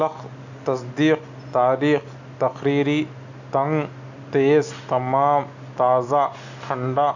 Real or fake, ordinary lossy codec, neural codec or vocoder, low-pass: real; none; none; 7.2 kHz